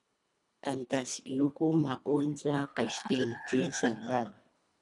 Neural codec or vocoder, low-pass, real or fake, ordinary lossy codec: codec, 24 kHz, 1.5 kbps, HILCodec; 10.8 kHz; fake; MP3, 96 kbps